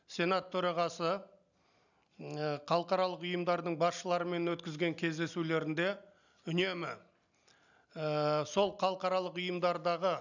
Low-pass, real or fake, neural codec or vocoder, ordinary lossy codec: 7.2 kHz; real; none; none